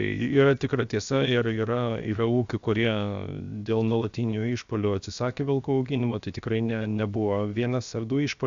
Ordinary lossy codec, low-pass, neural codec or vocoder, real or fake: Opus, 64 kbps; 7.2 kHz; codec, 16 kHz, 0.7 kbps, FocalCodec; fake